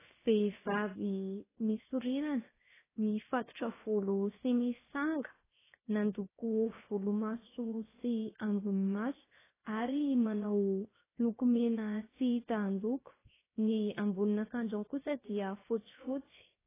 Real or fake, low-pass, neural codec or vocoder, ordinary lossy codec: fake; 3.6 kHz; codec, 16 kHz, 0.7 kbps, FocalCodec; AAC, 16 kbps